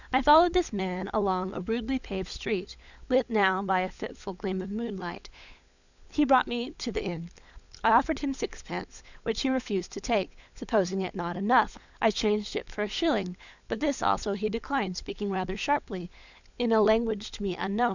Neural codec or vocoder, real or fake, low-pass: codec, 16 kHz, 16 kbps, FunCodec, trained on LibriTTS, 50 frames a second; fake; 7.2 kHz